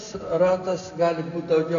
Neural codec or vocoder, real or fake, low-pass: none; real; 7.2 kHz